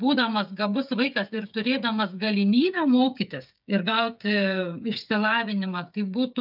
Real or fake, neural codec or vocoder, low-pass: fake; codec, 16 kHz, 8 kbps, FreqCodec, smaller model; 5.4 kHz